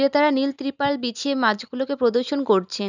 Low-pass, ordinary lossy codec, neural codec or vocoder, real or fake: 7.2 kHz; none; none; real